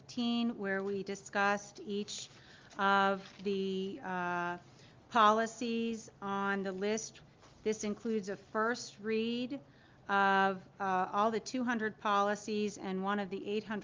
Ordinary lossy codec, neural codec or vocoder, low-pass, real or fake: Opus, 32 kbps; none; 7.2 kHz; real